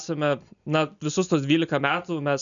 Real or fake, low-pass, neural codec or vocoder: real; 7.2 kHz; none